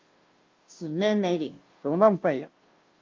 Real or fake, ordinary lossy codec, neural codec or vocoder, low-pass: fake; Opus, 32 kbps; codec, 16 kHz, 0.5 kbps, FunCodec, trained on Chinese and English, 25 frames a second; 7.2 kHz